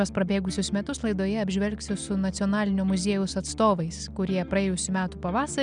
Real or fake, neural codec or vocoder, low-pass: real; none; 9.9 kHz